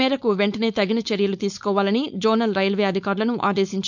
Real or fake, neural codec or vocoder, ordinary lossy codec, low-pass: fake; codec, 16 kHz, 4.8 kbps, FACodec; none; 7.2 kHz